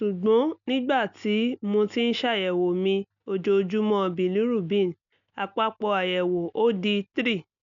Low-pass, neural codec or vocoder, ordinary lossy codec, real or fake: 7.2 kHz; none; none; real